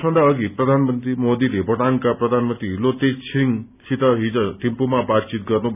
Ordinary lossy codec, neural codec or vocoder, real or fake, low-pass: none; none; real; 3.6 kHz